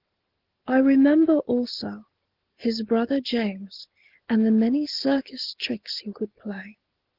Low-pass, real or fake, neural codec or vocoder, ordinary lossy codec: 5.4 kHz; real; none; Opus, 16 kbps